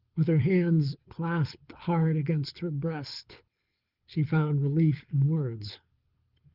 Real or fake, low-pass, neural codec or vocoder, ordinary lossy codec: fake; 5.4 kHz; codec, 24 kHz, 6 kbps, HILCodec; Opus, 32 kbps